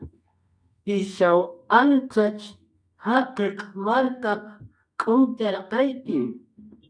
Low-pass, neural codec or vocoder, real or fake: 9.9 kHz; codec, 24 kHz, 0.9 kbps, WavTokenizer, medium music audio release; fake